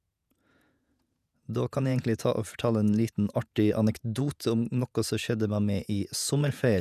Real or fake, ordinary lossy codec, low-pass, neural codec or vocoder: fake; none; 14.4 kHz; vocoder, 48 kHz, 128 mel bands, Vocos